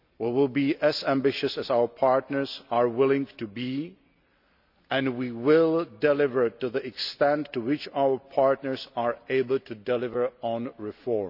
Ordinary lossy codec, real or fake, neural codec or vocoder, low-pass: none; real; none; 5.4 kHz